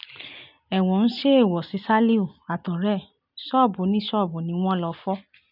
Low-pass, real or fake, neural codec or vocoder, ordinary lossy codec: 5.4 kHz; real; none; none